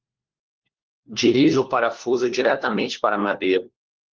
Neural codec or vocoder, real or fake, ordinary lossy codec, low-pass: codec, 16 kHz, 1 kbps, FunCodec, trained on LibriTTS, 50 frames a second; fake; Opus, 16 kbps; 7.2 kHz